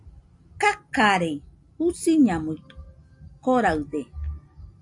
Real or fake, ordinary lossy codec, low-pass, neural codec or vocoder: real; AAC, 64 kbps; 10.8 kHz; none